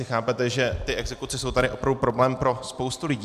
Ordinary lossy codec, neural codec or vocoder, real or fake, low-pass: MP3, 96 kbps; none; real; 14.4 kHz